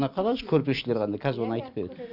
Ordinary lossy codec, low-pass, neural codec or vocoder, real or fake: none; 5.4 kHz; none; real